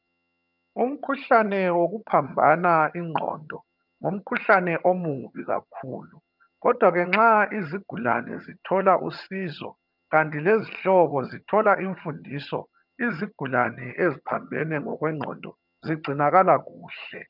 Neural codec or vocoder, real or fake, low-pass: vocoder, 22.05 kHz, 80 mel bands, HiFi-GAN; fake; 5.4 kHz